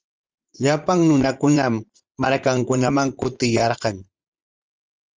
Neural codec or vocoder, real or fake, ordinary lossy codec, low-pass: vocoder, 44.1 kHz, 80 mel bands, Vocos; fake; Opus, 24 kbps; 7.2 kHz